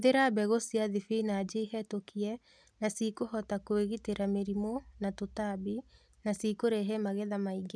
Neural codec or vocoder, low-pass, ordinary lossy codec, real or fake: none; none; none; real